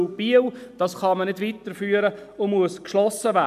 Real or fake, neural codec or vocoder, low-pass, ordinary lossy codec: real; none; 14.4 kHz; none